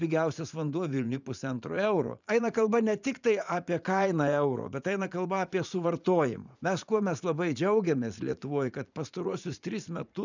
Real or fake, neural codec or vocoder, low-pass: fake; vocoder, 44.1 kHz, 80 mel bands, Vocos; 7.2 kHz